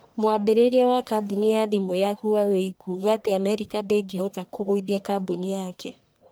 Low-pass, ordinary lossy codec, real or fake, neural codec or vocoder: none; none; fake; codec, 44.1 kHz, 1.7 kbps, Pupu-Codec